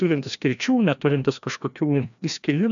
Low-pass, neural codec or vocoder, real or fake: 7.2 kHz; codec, 16 kHz, 1 kbps, FreqCodec, larger model; fake